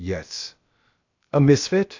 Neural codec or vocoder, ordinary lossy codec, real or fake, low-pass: codec, 16 kHz, about 1 kbps, DyCAST, with the encoder's durations; AAC, 48 kbps; fake; 7.2 kHz